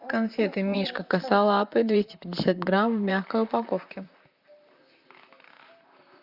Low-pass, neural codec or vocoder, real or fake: 5.4 kHz; vocoder, 44.1 kHz, 128 mel bands, Pupu-Vocoder; fake